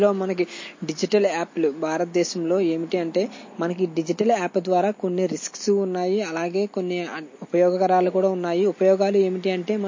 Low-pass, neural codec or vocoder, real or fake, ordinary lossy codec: 7.2 kHz; none; real; MP3, 32 kbps